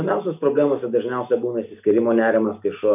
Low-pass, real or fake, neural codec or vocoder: 3.6 kHz; real; none